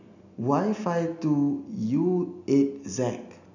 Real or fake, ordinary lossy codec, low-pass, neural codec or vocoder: real; none; 7.2 kHz; none